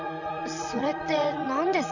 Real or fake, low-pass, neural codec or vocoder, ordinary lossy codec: fake; 7.2 kHz; vocoder, 44.1 kHz, 80 mel bands, Vocos; none